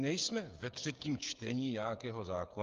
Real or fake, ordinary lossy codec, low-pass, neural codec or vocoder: fake; Opus, 32 kbps; 7.2 kHz; codec, 16 kHz, 8 kbps, FreqCodec, smaller model